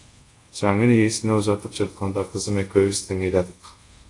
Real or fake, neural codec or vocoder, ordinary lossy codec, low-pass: fake; codec, 24 kHz, 0.5 kbps, DualCodec; AAC, 48 kbps; 10.8 kHz